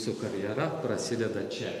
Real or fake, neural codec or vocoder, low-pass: real; none; 14.4 kHz